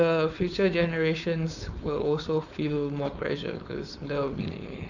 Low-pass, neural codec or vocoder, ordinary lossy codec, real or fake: 7.2 kHz; codec, 16 kHz, 4.8 kbps, FACodec; none; fake